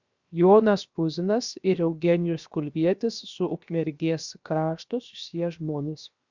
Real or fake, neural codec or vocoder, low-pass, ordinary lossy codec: fake; codec, 16 kHz, 0.7 kbps, FocalCodec; 7.2 kHz; Opus, 64 kbps